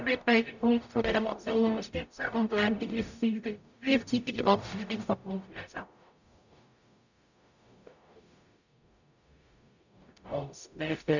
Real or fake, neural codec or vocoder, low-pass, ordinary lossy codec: fake; codec, 44.1 kHz, 0.9 kbps, DAC; 7.2 kHz; none